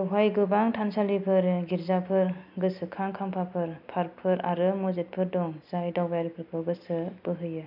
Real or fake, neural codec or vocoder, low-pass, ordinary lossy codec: real; none; 5.4 kHz; none